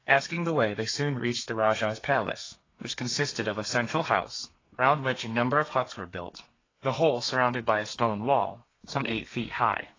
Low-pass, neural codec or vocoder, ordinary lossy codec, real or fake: 7.2 kHz; codec, 32 kHz, 1.9 kbps, SNAC; AAC, 32 kbps; fake